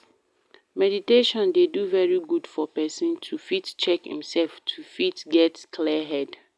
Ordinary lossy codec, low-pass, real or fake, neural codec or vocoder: none; none; real; none